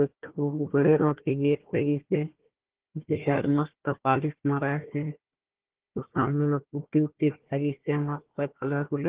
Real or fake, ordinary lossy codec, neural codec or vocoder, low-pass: fake; Opus, 16 kbps; codec, 16 kHz, 1 kbps, FunCodec, trained on Chinese and English, 50 frames a second; 3.6 kHz